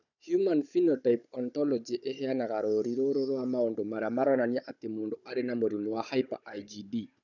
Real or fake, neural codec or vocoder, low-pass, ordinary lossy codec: fake; codec, 16 kHz, 16 kbps, FunCodec, trained on Chinese and English, 50 frames a second; 7.2 kHz; none